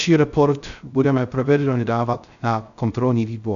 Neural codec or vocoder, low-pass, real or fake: codec, 16 kHz, 0.3 kbps, FocalCodec; 7.2 kHz; fake